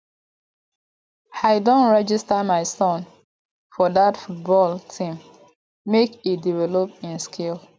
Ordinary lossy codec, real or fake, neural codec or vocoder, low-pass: none; real; none; none